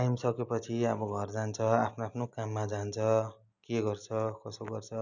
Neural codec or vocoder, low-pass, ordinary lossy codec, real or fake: none; 7.2 kHz; none; real